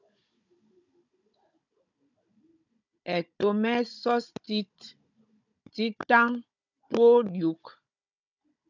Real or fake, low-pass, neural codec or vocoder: fake; 7.2 kHz; codec, 16 kHz, 16 kbps, FunCodec, trained on Chinese and English, 50 frames a second